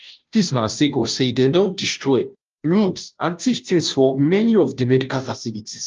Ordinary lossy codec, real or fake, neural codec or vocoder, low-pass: Opus, 24 kbps; fake; codec, 16 kHz, 0.5 kbps, FunCodec, trained on Chinese and English, 25 frames a second; 7.2 kHz